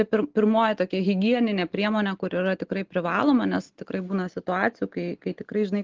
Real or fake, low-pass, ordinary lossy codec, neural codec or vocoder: real; 7.2 kHz; Opus, 16 kbps; none